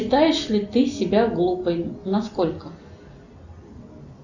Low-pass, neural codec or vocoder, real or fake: 7.2 kHz; none; real